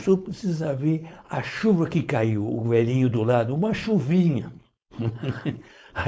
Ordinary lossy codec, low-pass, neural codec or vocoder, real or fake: none; none; codec, 16 kHz, 4.8 kbps, FACodec; fake